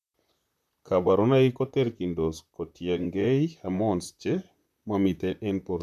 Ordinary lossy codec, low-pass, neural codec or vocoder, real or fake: none; 14.4 kHz; vocoder, 44.1 kHz, 128 mel bands, Pupu-Vocoder; fake